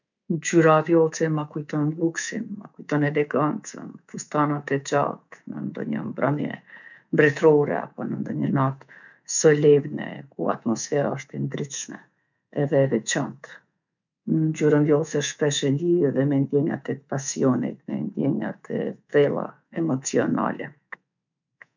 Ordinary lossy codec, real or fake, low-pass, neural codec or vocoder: none; fake; 7.2 kHz; codec, 24 kHz, 3.1 kbps, DualCodec